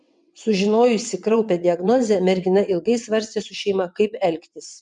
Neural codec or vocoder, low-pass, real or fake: vocoder, 22.05 kHz, 80 mel bands, WaveNeXt; 9.9 kHz; fake